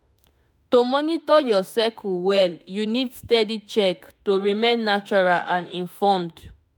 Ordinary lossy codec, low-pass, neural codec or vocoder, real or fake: none; none; autoencoder, 48 kHz, 32 numbers a frame, DAC-VAE, trained on Japanese speech; fake